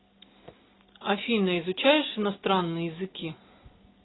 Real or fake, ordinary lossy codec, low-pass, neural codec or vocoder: real; AAC, 16 kbps; 7.2 kHz; none